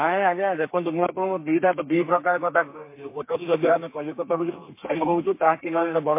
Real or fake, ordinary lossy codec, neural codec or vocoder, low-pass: fake; MP3, 24 kbps; codec, 32 kHz, 1.9 kbps, SNAC; 3.6 kHz